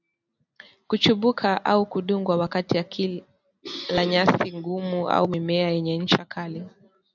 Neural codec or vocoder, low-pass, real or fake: none; 7.2 kHz; real